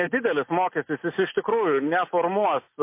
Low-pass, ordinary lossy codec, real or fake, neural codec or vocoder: 3.6 kHz; MP3, 24 kbps; real; none